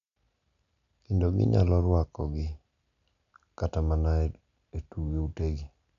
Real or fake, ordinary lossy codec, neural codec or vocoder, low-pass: real; none; none; 7.2 kHz